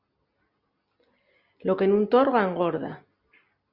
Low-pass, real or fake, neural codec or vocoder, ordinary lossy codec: 5.4 kHz; real; none; Opus, 64 kbps